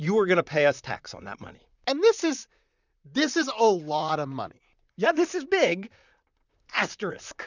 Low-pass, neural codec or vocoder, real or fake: 7.2 kHz; vocoder, 44.1 kHz, 128 mel bands, Pupu-Vocoder; fake